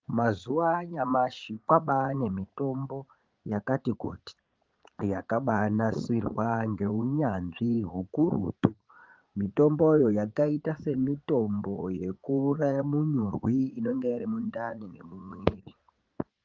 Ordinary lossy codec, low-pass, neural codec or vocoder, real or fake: Opus, 32 kbps; 7.2 kHz; vocoder, 44.1 kHz, 80 mel bands, Vocos; fake